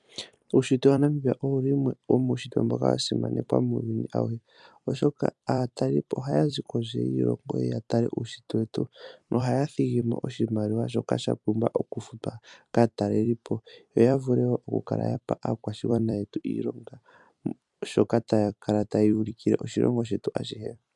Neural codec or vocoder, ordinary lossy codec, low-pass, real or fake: vocoder, 44.1 kHz, 128 mel bands every 256 samples, BigVGAN v2; MP3, 96 kbps; 10.8 kHz; fake